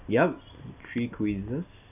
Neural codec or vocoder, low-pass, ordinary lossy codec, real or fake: none; 3.6 kHz; none; real